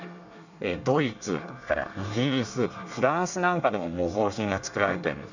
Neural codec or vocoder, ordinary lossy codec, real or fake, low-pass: codec, 24 kHz, 1 kbps, SNAC; none; fake; 7.2 kHz